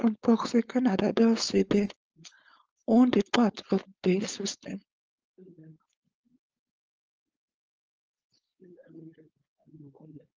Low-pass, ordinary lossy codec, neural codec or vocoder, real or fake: 7.2 kHz; Opus, 24 kbps; codec, 16 kHz, 4.8 kbps, FACodec; fake